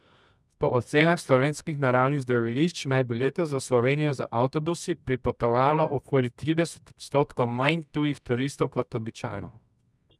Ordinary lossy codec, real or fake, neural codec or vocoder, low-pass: none; fake; codec, 24 kHz, 0.9 kbps, WavTokenizer, medium music audio release; none